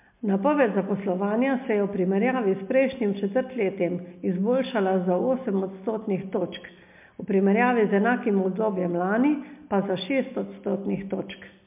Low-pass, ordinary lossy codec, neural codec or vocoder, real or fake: 3.6 kHz; MP3, 32 kbps; none; real